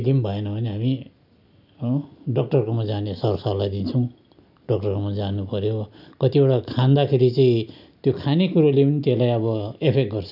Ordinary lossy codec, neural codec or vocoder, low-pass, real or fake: none; none; 5.4 kHz; real